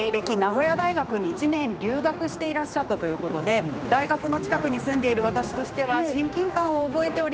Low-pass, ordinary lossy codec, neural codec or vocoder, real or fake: none; none; codec, 16 kHz, 2 kbps, X-Codec, HuBERT features, trained on general audio; fake